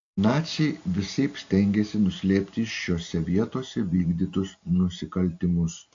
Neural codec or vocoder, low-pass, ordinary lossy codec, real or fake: none; 7.2 kHz; AAC, 48 kbps; real